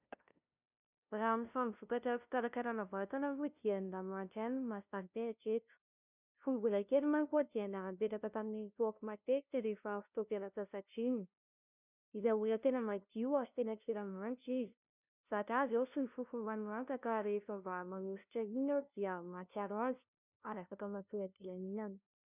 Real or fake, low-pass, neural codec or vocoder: fake; 3.6 kHz; codec, 16 kHz, 0.5 kbps, FunCodec, trained on LibriTTS, 25 frames a second